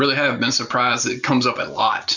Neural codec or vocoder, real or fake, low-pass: none; real; 7.2 kHz